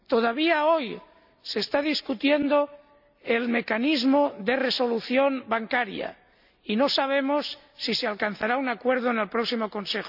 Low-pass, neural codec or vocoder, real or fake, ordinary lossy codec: 5.4 kHz; none; real; none